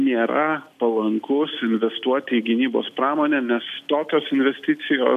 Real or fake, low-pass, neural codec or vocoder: real; 14.4 kHz; none